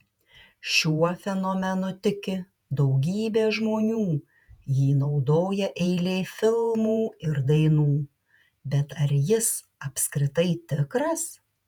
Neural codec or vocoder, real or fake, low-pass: vocoder, 48 kHz, 128 mel bands, Vocos; fake; 19.8 kHz